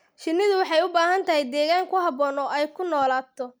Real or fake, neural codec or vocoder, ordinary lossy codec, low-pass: real; none; none; none